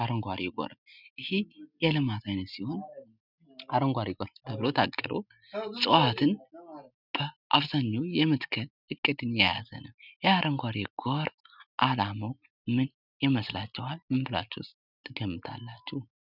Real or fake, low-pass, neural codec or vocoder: real; 5.4 kHz; none